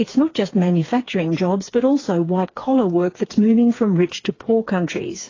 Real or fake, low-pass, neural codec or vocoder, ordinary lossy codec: fake; 7.2 kHz; codec, 16 kHz, 4 kbps, FreqCodec, smaller model; AAC, 32 kbps